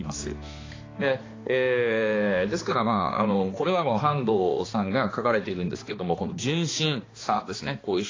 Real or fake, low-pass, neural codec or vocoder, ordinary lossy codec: fake; 7.2 kHz; codec, 16 kHz, 2 kbps, X-Codec, HuBERT features, trained on balanced general audio; AAC, 32 kbps